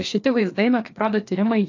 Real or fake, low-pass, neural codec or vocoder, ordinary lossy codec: fake; 7.2 kHz; codec, 16 kHz, 2 kbps, FreqCodec, larger model; AAC, 48 kbps